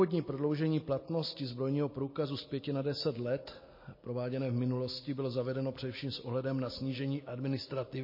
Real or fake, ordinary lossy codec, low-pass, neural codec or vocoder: real; MP3, 24 kbps; 5.4 kHz; none